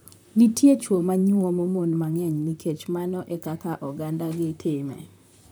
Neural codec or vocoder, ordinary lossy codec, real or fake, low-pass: vocoder, 44.1 kHz, 128 mel bands, Pupu-Vocoder; none; fake; none